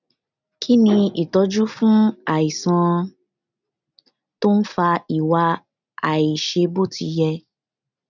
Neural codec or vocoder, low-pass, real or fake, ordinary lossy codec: none; 7.2 kHz; real; none